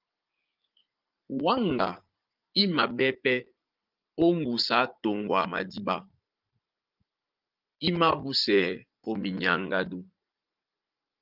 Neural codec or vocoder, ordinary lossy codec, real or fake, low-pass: vocoder, 44.1 kHz, 80 mel bands, Vocos; Opus, 32 kbps; fake; 5.4 kHz